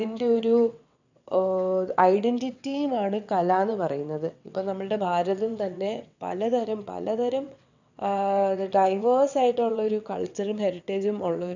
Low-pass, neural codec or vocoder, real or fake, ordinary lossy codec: 7.2 kHz; vocoder, 22.05 kHz, 80 mel bands, WaveNeXt; fake; none